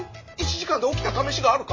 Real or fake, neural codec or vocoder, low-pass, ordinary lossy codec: real; none; 7.2 kHz; MP3, 32 kbps